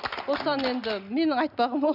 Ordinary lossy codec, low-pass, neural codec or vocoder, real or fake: none; 5.4 kHz; none; real